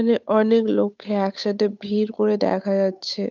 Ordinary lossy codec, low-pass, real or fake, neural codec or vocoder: none; 7.2 kHz; fake; codec, 16 kHz, 8 kbps, FunCodec, trained on Chinese and English, 25 frames a second